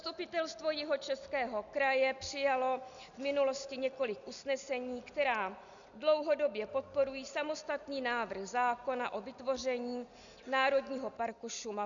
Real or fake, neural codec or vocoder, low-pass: real; none; 7.2 kHz